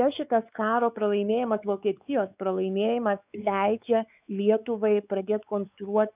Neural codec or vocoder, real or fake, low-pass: codec, 16 kHz, 4 kbps, X-Codec, WavLM features, trained on Multilingual LibriSpeech; fake; 3.6 kHz